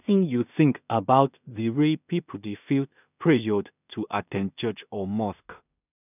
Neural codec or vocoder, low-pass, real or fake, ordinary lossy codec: codec, 16 kHz in and 24 kHz out, 0.4 kbps, LongCat-Audio-Codec, two codebook decoder; 3.6 kHz; fake; none